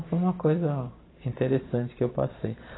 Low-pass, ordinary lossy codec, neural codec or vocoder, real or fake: 7.2 kHz; AAC, 16 kbps; vocoder, 44.1 kHz, 128 mel bands every 512 samples, BigVGAN v2; fake